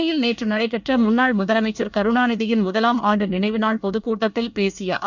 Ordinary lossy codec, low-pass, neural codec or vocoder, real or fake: none; 7.2 kHz; codec, 24 kHz, 1 kbps, SNAC; fake